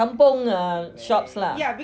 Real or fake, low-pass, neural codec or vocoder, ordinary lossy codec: real; none; none; none